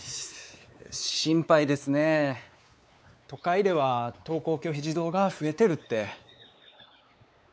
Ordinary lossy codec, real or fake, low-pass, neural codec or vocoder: none; fake; none; codec, 16 kHz, 4 kbps, X-Codec, WavLM features, trained on Multilingual LibriSpeech